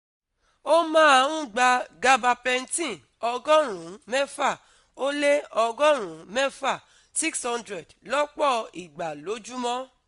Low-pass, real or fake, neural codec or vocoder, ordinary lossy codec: 10.8 kHz; real; none; MP3, 64 kbps